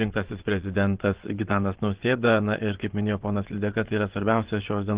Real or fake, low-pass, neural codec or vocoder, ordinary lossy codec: fake; 3.6 kHz; vocoder, 24 kHz, 100 mel bands, Vocos; Opus, 16 kbps